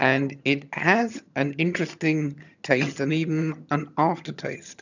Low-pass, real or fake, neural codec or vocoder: 7.2 kHz; fake; vocoder, 22.05 kHz, 80 mel bands, HiFi-GAN